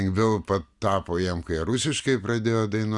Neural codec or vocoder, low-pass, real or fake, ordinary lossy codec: none; 10.8 kHz; real; Opus, 64 kbps